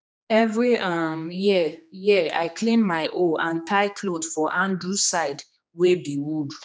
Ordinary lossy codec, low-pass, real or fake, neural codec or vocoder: none; none; fake; codec, 16 kHz, 2 kbps, X-Codec, HuBERT features, trained on general audio